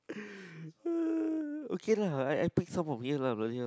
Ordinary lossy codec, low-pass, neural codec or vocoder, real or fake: none; none; none; real